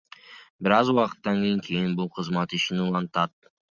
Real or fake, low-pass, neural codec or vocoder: real; 7.2 kHz; none